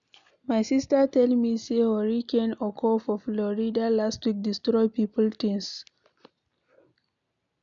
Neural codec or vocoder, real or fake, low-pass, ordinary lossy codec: none; real; 7.2 kHz; none